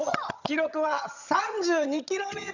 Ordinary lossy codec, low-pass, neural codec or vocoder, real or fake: none; 7.2 kHz; vocoder, 22.05 kHz, 80 mel bands, HiFi-GAN; fake